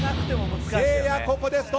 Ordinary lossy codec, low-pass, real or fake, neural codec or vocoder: none; none; real; none